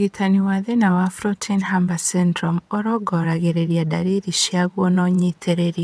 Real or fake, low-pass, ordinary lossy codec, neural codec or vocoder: fake; none; none; vocoder, 22.05 kHz, 80 mel bands, WaveNeXt